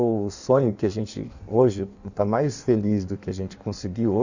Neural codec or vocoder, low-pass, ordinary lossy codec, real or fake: codec, 16 kHz in and 24 kHz out, 1.1 kbps, FireRedTTS-2 codec; 7.2 kHz; none; fake